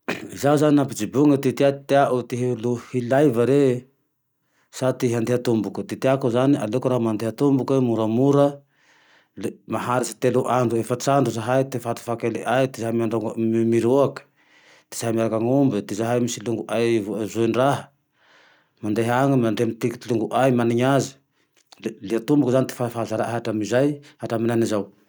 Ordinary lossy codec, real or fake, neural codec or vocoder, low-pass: none; real; none; none